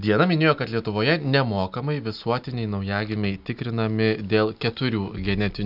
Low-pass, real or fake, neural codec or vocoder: 5.4 kHz; real; none